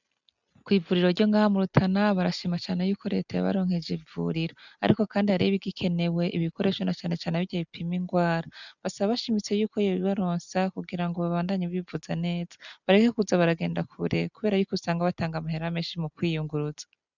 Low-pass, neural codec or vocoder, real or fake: 7.2 kHz; none; real